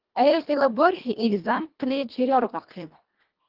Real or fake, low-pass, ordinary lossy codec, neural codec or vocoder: fake; 5.4 kHz; Opus, 32 kbps; codec, 24 kHz, 1.5 kbps, HILCodec